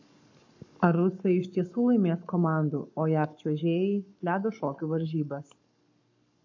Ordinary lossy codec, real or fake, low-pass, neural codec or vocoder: AAC, 48 kbps; fake; 7.2 kHz; codec, 44.1 kHz, 7.8 kbps, Pupu-Codec